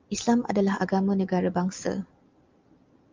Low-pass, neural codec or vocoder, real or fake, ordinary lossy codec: 7.2 kHz; none; real; Opus, 24 kbps